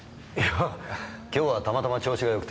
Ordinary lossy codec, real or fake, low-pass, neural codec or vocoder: none; real; none; none